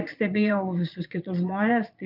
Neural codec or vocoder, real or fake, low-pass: vocoder, 22.05 kHz, 80 mel bands, Vocos; fake; 5.4 kHz